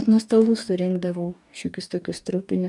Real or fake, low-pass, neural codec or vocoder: fake; 10.8 kHz; codec, 44.1 kHz, 2.6 kbps, DAC